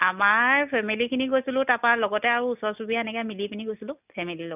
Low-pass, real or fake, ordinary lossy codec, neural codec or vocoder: 3.6 kHz; real; none; none